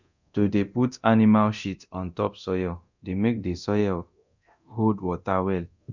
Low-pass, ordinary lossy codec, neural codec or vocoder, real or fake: 7.2 kHz; none; codec, 24 kHz, 0.9 kbps, DualCodec; fake